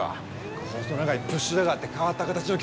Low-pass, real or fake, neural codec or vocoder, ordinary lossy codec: none; real; none; none